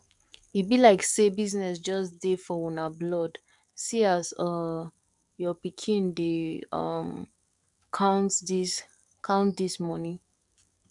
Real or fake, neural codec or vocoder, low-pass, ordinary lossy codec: fake; codec, 44.1 kHz, 7.8 kbps, DAC; 10.8 kHz; none